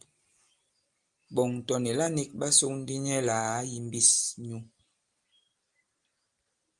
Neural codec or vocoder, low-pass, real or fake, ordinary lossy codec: none; 10.8 kHz; real; Opus, 32 kbps